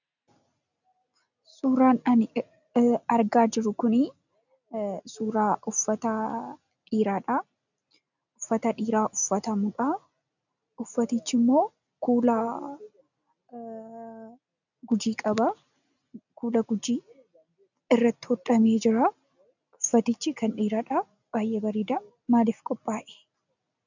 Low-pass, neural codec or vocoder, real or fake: 7.2 kHz; none; real